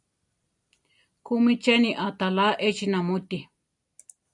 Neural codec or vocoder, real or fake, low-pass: none; real; 10.8 kHz